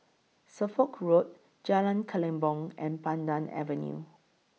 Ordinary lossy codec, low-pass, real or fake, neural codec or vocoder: none; none; real; none